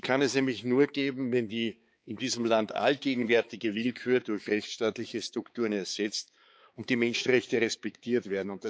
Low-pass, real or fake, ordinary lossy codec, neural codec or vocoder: none; fake; none; codec, 16 kHz, 4 kbps, X-Codec, HuBERT features, trained on balanced general audio